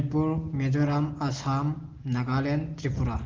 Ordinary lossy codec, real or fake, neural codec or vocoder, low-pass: Opus, 16 kbps; real; none; 7.2 kHz